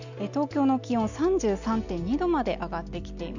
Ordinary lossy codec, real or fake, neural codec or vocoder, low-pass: none; real; none; 7.2 kHz